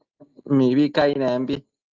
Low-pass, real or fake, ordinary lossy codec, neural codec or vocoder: 7.2 kHz; real; Opus, 32 kbps; none